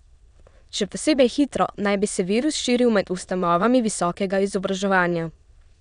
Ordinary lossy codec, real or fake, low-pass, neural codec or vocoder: none; fake; 9.9 kHz; autoencoder, 22.05 kHz, a latent of 192 numbers a frame, VITS, trained on many speakers